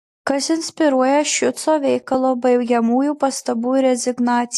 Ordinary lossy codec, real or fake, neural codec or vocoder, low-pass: AAC, 64 kbps; real; none; 14.4 kHz